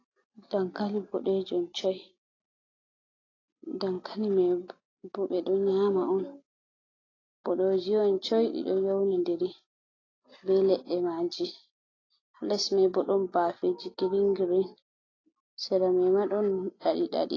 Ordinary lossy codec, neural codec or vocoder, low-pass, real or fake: AAC, 32 kbps; none; 7.2 kHz; real